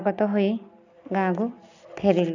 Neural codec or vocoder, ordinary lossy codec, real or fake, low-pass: none; none; real; 7.2 kHz